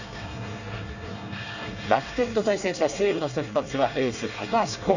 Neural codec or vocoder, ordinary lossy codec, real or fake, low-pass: codec, 24 kHz, 1 kbps, SNAC; none; fake; 7.2 kHz